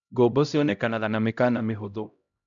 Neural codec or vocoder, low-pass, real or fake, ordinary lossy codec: codec, 16 kHz, 0.5 kbps, X-Codec, HuBERT features, trained on LibriSpeech; 7.2 kHz; fake; none